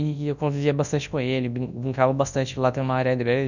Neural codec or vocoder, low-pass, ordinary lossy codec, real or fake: codec, 24 kHz, 0.9 kbps, WavTokenizer, large speech release; 7.2 kHz; none; fake